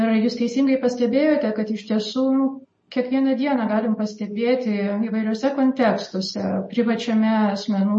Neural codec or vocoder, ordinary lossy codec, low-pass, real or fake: none; MP3, 32 kbps; 10.8 kHz; real